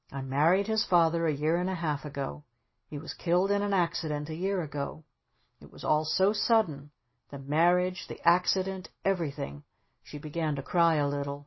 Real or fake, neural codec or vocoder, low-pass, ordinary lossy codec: real; none; 7.2 kHz; MP3, 24 kbps